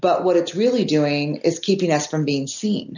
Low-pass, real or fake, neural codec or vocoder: 7.2 kHz; real; none